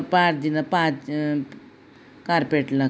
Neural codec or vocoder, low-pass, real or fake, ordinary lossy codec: none; none; real; none